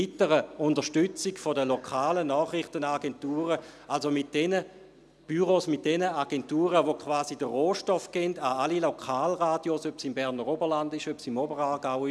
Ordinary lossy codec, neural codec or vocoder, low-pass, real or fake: none; none; none; real